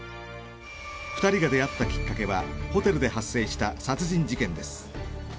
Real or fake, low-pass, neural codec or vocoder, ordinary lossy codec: real; none; none; none